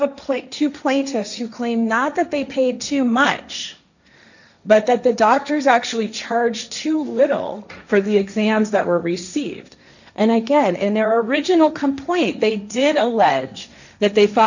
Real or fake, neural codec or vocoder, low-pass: fake; codec, 16 kHz, 1.1 kbps, Voila-Tokenizer; 7.2 kHz